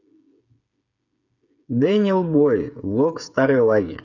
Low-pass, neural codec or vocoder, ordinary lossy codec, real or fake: 7.2 kHz; codec, 16 kHz, 8 kbps, FreqCodec, smaller model; none; fake